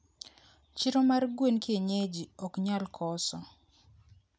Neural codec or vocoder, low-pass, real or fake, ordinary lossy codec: none; none; real; none